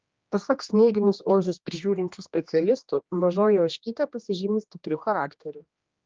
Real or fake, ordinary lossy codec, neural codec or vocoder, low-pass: fake; Opus, 24 kbps; codec, 16 kHz, 1 kbps, X-Codec, HuBERT features, trained on general audio; 7.2 kHz